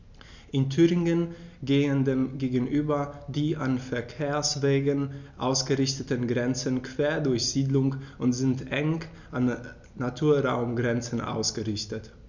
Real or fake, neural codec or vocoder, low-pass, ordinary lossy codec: real; none; 7.2 kHz; none